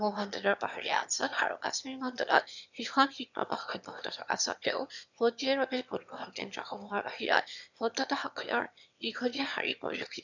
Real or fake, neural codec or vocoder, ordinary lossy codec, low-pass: fake; autoencoder, 22.05 kHz, a latent of 192 numbers a frame, VITS, trained on one speaker; none; 7.2 kHz